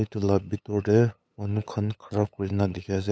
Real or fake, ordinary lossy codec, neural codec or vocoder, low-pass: fake; none; codec, 16 kHz, 8 kbps, FunCodec, trained on LibriTTS, 25 frames a second; none